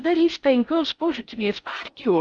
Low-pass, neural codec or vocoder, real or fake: 9.9 kHz; codec, 16 kHz in and 24 kHz out, 0.6 kbps, FocalCodec, streaming, 4096 codes; fake